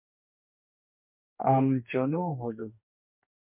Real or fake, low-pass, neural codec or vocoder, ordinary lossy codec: fake; 3.6 kHz; codec, 44.1 kHz, 2.6 kbps, DAC; MP3, 32 kbps